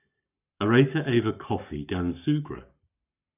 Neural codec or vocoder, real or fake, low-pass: codec, 44.1 kHz, 7.8 kbps, Pupu-Codec; fake; 3.6 kHz